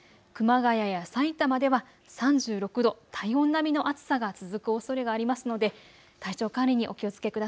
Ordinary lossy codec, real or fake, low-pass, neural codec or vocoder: none; real; none; none